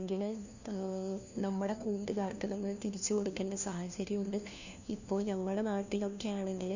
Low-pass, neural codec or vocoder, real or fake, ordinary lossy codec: 7.2 kHz; codec, 16 kHz, 1 kbps, FunCodec, trained on LibriTTS, 50 frames a second; fake; none